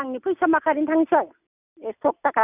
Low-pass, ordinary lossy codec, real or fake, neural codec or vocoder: 3.6 kHz; none; real; none